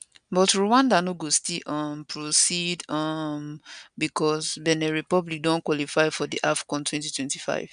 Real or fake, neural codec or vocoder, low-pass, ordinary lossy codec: real; none; 9.9 kHz; none